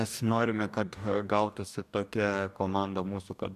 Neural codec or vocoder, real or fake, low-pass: codec, 44.1 kHz, 2.6 kbps, DAC; fake; 14.4 kHz